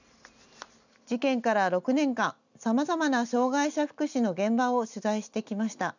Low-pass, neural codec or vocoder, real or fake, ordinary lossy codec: 7.2 kHz; none; real; none